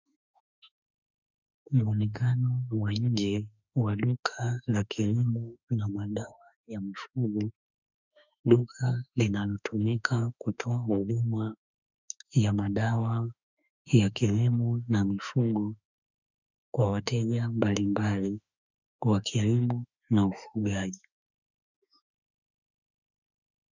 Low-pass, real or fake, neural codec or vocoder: 7.2 kHz; fake; autoencoder, 48 kHz, 32 numbers a frame, DAC-VAE, trained on Japanese speech